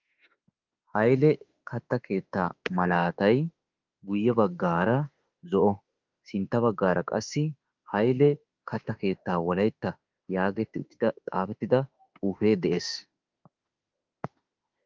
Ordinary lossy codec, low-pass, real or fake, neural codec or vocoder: Opus, 32 kbps; 7.2 kHz; fake; autoencoder, 48 kHz, 32 numbers a frame, DAC-VAE, trained on Japanese speech